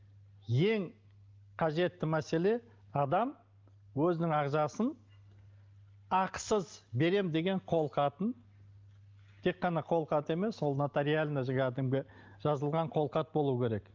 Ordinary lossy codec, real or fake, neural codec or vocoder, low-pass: Opus, 32 kbps; real; none; 7.2 kHz